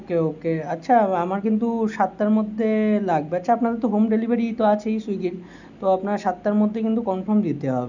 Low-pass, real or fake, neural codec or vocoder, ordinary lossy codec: 7.2 kHz; real; none; none